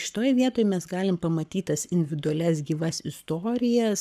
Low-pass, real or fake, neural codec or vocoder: 14.4 kHz; fake; codec, 44.1 kHz, 7.8 kbps, Pupu-Codec